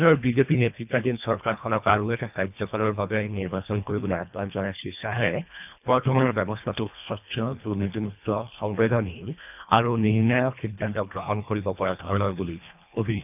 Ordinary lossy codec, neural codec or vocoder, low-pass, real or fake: none; codec, 24 kHz, 1.5 kbps, HILCodec; 3.6 kHz; fake